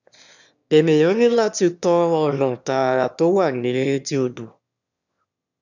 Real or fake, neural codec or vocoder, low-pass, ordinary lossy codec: fake; autoencoder, 22.05 kHz, a latent of 192 numbers a frame, VITS, trained on one speaker; 7.2 kHz; none